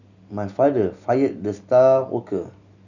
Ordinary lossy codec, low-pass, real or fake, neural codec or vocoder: none; 7.2 kHz; real; none